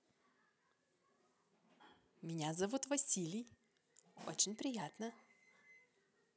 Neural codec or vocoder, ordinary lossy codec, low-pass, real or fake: none; none; none; real